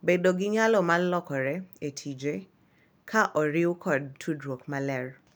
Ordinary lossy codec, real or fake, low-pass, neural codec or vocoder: none; real; none; none